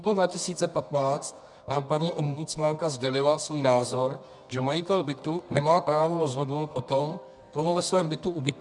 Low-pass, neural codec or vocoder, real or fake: 10.8 kHz; codec, 24 kHz, 0.9 kbps, WavTokenizer, medium music audio release; fake